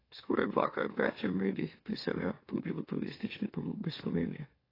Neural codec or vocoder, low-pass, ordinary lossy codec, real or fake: autoencoder, 44.1 kHz, a latent of 192 numbers a frame, MeloTTS; 5.4 kHz; AAC, 24 kbps; fake